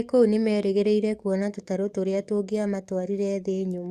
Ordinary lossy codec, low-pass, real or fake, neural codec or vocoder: Opus, 64 kbps; 14.4 kHz; fake; codec, 44.1 kHz, 7.8 kbps, DAC